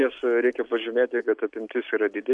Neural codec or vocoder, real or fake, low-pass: none; real; 10.8 kHz